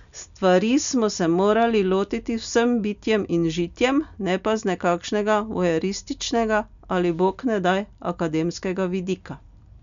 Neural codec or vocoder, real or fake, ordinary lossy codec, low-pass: none; real; none; 7.2 kHz